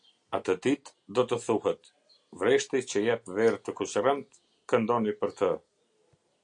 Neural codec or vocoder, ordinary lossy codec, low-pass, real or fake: none; AAC, 64 kbps; 9.9 kHz; real